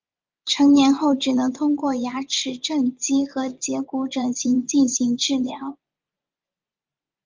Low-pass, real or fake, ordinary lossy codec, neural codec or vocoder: 7.2 kHz; fake; Opus, 24 kbps; vocoder, 24 kHz, 100 mel bands, Vocos